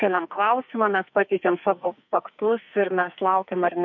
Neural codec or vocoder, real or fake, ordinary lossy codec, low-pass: codec, 44.1 kHz, 2.6 kbps, SNAC; fake; MP3, 48 kbps; 7.2 kHz